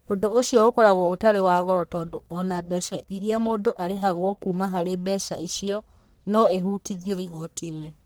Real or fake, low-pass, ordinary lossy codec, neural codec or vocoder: fake; none; none; codec, 44.1 kHz, 1.7 kbps, Pupu-Codec